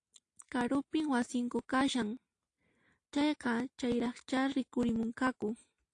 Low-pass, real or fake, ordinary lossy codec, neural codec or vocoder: 10.8 kHz; real; AAC, 48 kbps; none